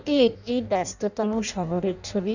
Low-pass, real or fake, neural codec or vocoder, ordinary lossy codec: 7.2 kHz; fake; codec, 16 kHz in and 24 kHz out, 0.6 kbps, FireRedTTS-2 codec; none